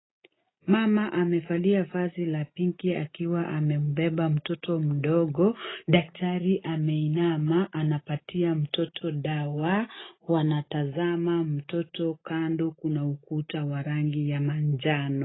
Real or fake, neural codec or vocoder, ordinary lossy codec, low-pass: real; none; AAC, 16 kbps; 7.2 kHz